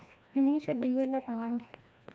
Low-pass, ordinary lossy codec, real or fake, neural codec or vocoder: none; none; fake; codec, 16 kHz, 1 kbps, FreqCodec, larger model